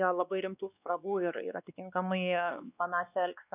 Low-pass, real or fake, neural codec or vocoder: 3.6 kHz; fake; codec, 16 kHz, 2 kbps, X-Codec, WavLM features, trained on Multilingual LibriSpeech